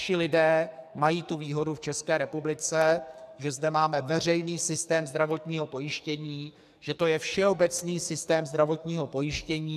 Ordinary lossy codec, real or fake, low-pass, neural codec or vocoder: AAC, 96 kbps; fake; 14.4 kHz; codec, 44.1 kHz, 2.6 kbps, SNAC